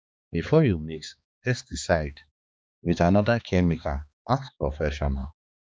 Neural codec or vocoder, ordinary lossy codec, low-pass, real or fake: codec, 16 kHz, 2 kbps, X-Codec, HuBERT features, trained on balanced general audio; none; none; fake